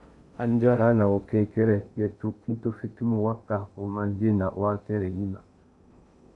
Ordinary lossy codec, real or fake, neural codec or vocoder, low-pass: AAC, 64 kbps; fake; codec, 16 kHz in and 24 kHz out, 0.6 kbps, FocalCodec, streaming, 2048 codes; 10.8 kHz